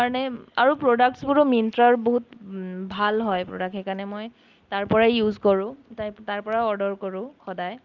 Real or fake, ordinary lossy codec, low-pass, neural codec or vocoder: real; Opus, 24 kbps; 7.2 kHz; none